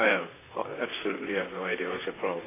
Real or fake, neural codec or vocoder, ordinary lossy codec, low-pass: fake; vocoder, 44.1 kHz, 128 mel bands, Pupu-Vocoder; AAC, 16 kbps; 3.6 kHz